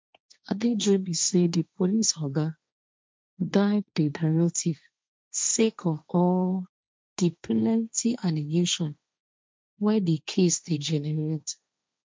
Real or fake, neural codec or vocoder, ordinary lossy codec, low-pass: fake; codec, 16 kHz, 1.1 kbps, Voila-Tokenizer; none; none